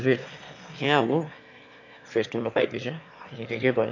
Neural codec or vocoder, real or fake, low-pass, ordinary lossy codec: autoencoder, 22.05 kHz, a latent of 192 numbers a frame, VITS, trained on one speaker; fake; 7.2 kHz; MP3, 48 kbps